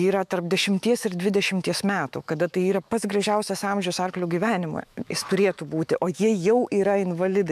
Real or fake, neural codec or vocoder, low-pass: fake; autoencoder, 48 kHz, 128 numbers a frame, DAC-VAE, trained on Japanese speech; 14.4 kHz